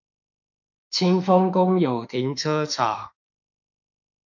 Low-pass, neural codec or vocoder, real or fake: 7.2 kHz; autoencoder, 48 kHz, 32 numbers a frame, DAC-VAE, trained on Japanese speech; fake